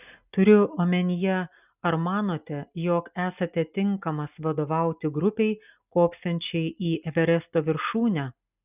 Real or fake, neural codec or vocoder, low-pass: real; none; 3.6 kHz